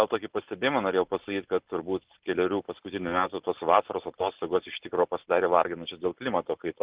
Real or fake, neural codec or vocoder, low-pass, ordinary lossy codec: real; none; 3.6 kHz; Opus, 16 kbps